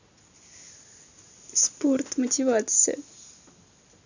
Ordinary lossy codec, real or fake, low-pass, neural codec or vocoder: none; real; 7.2 kHz; none